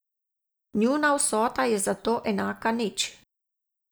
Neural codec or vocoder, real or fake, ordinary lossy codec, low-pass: none; real; none; none